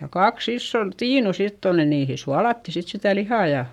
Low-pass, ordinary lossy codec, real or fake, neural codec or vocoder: 19.8 kHz; none; fake; codec, 44.1 kHz, 7.8 kbps, Pupu-Codec